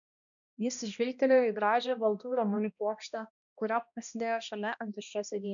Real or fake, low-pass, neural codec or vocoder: fake; 7.2 kHz; codec, 16 kHz, 1 kbps, X-Codec, HuBERT features, trained on balanced general audio